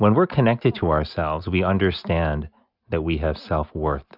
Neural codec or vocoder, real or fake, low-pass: none; real; 5.4 kHz